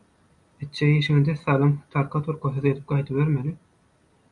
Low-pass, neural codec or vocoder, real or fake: 10.8 kHz; none; real